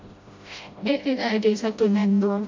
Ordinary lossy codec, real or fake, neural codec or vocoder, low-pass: MP3, 48 kbps; fake; codec, 16 kHz, 0.5 kbps, FreqCodec, smaller model; 7.2 kHz